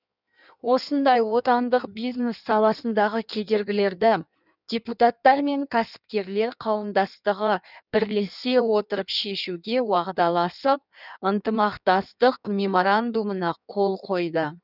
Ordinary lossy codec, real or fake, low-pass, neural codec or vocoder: none; fake; 5.4 kHz; codec, 16 kHz in and 24 kHz out, 1.1 kbps, FireRedTTS-2 codec